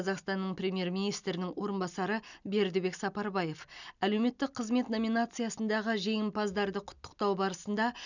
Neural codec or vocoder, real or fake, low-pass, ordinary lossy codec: none; real; 7.2 kHz; none